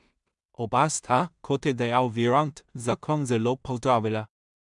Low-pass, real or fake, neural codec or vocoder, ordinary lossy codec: 10.8 kHz; fake; codec, 16 kHz in and 24 kHz out, 0.4 kbps, LongCat-Audio-Codec, two codebook decoder; MP3, 96 kbps